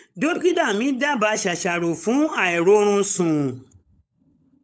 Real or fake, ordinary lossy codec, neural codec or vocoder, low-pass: fake; none; codec, 16 kHz, 16 kbps, FunCodec, trained on LibriTTS, 50 frames a second; none